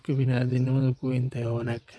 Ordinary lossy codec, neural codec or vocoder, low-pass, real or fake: none; vocoder, 22.05 kHz, 80 mel bands, WaveNeXt; none; fake